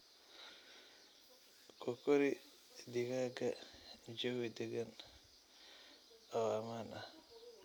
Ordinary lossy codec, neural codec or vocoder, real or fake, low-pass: none; none; real; none